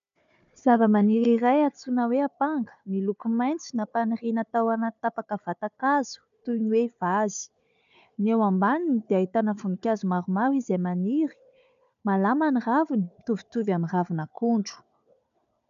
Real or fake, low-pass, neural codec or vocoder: fake; 7.2 kHz; codec, 16 kHz, 4 kbps, FunCodec, trained on Chinese and English, 50 frames a second